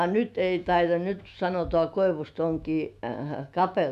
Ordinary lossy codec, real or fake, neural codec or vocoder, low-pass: none; fake; autoencoder, 48 kHz, 128 numbers a frame, DAC-VAE, trained on Japanese speech; 14.4 kHz